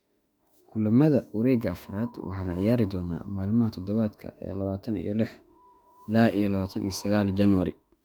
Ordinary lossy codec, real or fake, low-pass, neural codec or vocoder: none; fake; 19.8 kHz; autoencoder, 48 kHz, 32 numbers a frame, DAC-VAE, trained on Japanese speech